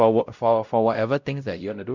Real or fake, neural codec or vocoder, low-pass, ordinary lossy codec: fake; codec, 16 kHz, 0.5 kbps, X-Codec, WavLM features, trained on Multilingual LibriSpeech; 7.2 kHz; none